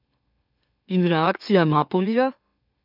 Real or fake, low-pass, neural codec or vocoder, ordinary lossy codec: fake; 5.4 kHz; autoencoder, 44.1 kHz, a latent of 192 numbers a frame, MeloTTS; none